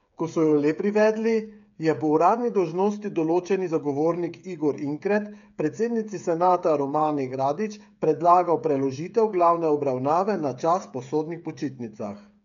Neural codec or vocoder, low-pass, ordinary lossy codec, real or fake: codec, 16 kHz, 8 kbps, FreqCodec, smaller model; 7.2 kHz; none; fake